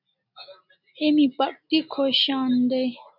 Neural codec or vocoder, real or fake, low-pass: none; real; 5.4 kHz